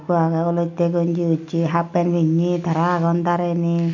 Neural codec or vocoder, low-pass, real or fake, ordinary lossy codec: none; 7.2 kHz; real; none